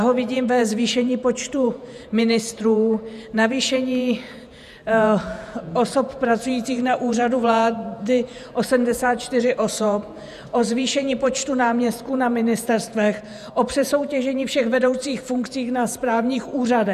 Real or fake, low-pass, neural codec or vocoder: fake; 14.4 kHz; vocoder, 48 kHz, 128 mel bands, Vocos